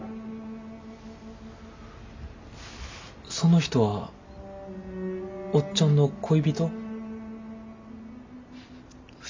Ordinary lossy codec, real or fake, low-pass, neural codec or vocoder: MP3, 48 kbps; real; 7.2 kHz; none